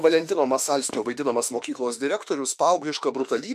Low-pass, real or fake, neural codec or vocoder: 14.4 kHz; fake; autoencoder, 48 kHz, 32 numbers a frame, DAC-VAE, trained on Japanese speech